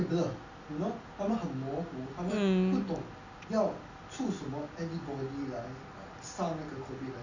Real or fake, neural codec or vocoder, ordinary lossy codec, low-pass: real; none; none; 7.2 kHz